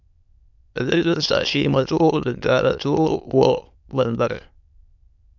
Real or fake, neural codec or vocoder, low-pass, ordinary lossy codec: fake; autoencoder, 22.05 kHz, a latent of 192 numbers a frame, VITS, trained on many speakers; 7.2 kHz; MP3, 64 kbps